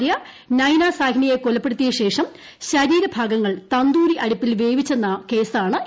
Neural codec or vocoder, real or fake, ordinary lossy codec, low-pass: none; real; none; none